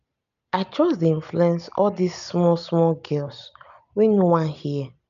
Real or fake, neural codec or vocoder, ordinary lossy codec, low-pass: real; none; none; 7.2 kHz